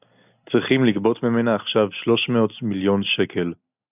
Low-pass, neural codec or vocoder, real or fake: 3.6 kHz; none; real